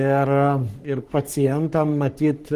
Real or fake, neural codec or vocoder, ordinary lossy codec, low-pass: fake; codec, 44.1 kHz, 3.4 kbps, Pupu-Codec; Opus, 24 kbps; 14.4 kHz